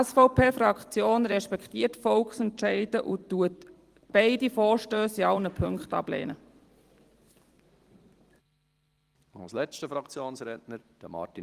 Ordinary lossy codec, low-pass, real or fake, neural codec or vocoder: Opus, 24 kbps; 14.4 kHz; fake; vocoder, 44.1 kHz, 128 mel bands every 256 samples, BigVGAN v2